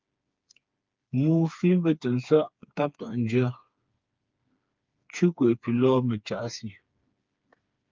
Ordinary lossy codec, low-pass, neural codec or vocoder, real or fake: Opus, 24 kbps; 7.2 kHz; codec, 16 kHz, 4 kbps, FreqCodec, smaller model; fake